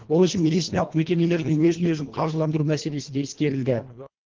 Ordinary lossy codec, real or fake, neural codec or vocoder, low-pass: Opus, 16 kbps; fake; codec, 24 kHz, 1.5 kbps, HILCodec; 7.2 kHz